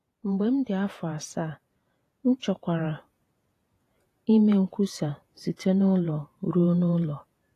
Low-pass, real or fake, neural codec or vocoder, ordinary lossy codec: 14.4 kHz; fake; vocoder, 48 kHz, 128 mel bands, Vocos; AAC, 48 kbps